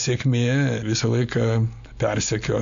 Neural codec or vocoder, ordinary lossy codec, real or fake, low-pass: none; MP3, 64 kbps; real; 7.2 kHz